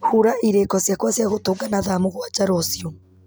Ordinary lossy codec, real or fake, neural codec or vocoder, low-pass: none; real; none; none